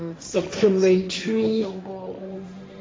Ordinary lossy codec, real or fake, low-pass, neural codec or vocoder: none; fake; none; codec, 16 kHz, 1.1 kbps, Voila-Tokenizer